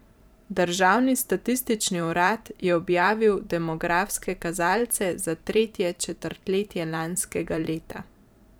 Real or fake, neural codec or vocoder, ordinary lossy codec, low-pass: fake; vocoder, 44.1 kHz, 128 mel bands every 512 samples, BigVGAN v2; none; none